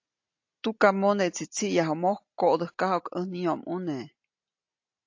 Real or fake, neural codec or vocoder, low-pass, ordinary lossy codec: real; none; 7.2 kHz; AAC, 48 kbps